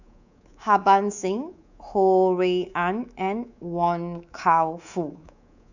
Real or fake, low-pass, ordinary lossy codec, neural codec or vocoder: fake; 7.2 kHz; none; codec, 24 kHz, 3.1 kbps, DualCodec